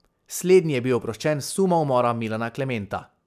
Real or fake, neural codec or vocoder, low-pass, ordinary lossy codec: real; none; 14.4 kHz; none